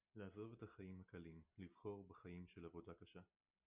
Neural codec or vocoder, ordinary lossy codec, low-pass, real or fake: none; Opus, 64 kbps; 3.6 kHz; real